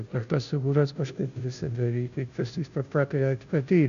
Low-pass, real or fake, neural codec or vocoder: 7.2 kHz; fake; codec, 16 kHz, 0.5 kbps, FunCodec, trained on Chinese and English, 25 frames a second